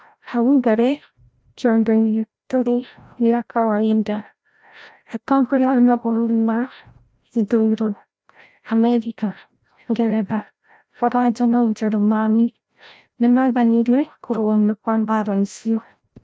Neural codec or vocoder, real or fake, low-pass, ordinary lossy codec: codec, 16 kHz, 0.5 kbps, FreqCodec, larger model; fake; none; none